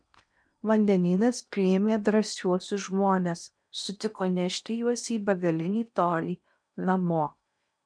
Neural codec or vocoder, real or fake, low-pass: codec, 16 kHz in and 24 kHz out, 0.8 kbps, FocalCodec, streaming, 65536 codes; fake; 9.9 kHz